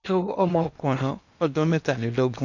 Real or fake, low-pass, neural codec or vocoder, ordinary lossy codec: fake; 7.2 kHz; codec, 16 kHz in and 24 kHz out, 0.8 kbps, FocalCodec, streaming, 65536 codes; none